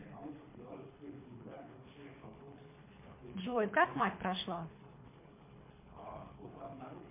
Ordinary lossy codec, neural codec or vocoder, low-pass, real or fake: MP3, 32 kbps; codec, 24 kHz, 3 kbps, HILCodec; 3.6 kHz; fake